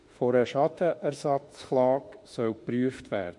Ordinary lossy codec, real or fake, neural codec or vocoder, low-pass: MP3, 48 kbps; fake; autoencoder, 48 kHz, 32 numbers a frame, DAC-VAE, trained on Japanese speech; 10.8 kHz